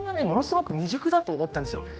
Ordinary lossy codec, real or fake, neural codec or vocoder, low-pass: none; fake; codec, 16 kHz, 1 kbps, X-Codec, HuBERT features, trained on general audio; none